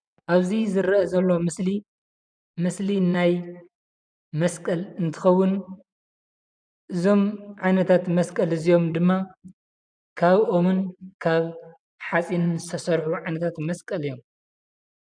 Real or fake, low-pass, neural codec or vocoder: fake; 9.9 kHz; vocoder, 24 kHz, 100 mel bands, Vocos